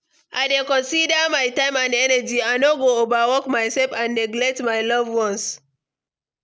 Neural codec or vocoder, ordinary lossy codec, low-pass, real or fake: none; none; none; real